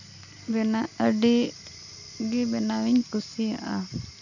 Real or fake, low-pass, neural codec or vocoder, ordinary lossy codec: real; 7.2 kHz; none; none